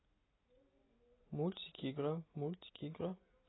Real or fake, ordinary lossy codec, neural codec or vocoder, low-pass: real; AAC, 16 kbps; none; 7.2 kHz